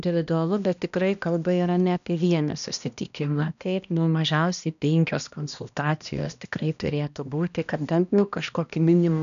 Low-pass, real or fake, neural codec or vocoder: 7.2 kHz; fake; codec, 16 kHz, 1 kbps, X-Codec, HuBERT features, trained on balanced general audio